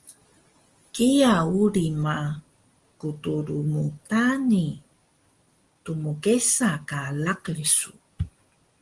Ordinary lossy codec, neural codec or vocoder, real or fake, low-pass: Opus, 24 kbps; none; real; 10.8 kHz